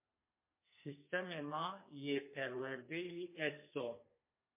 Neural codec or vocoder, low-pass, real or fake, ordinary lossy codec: codec, 32 kHz, 1.9 kbps, SNAC; 3.6 kHz; fake; MP3, 24 kbps